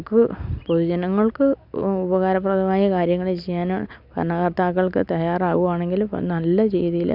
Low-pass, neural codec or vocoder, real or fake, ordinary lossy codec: 5.4 kHz; none; real; none